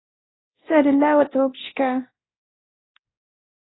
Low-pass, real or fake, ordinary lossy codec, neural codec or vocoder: 7.2 kHz; fake; AAC, 16 kbps; codec, 24 kHz, 0.9 kbps, DualCodec